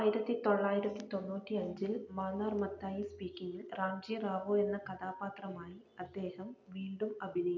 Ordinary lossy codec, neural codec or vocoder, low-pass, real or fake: none; none; 7.2 kHz; real